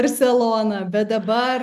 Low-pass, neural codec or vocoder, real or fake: 14.4 kHz; none; real